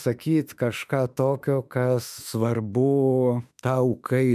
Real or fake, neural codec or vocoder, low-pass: fake; autoencoder, 48 kHz, 32 numbers a frame, DAC-VAE, trained on Japanese speech; 14.4 kHz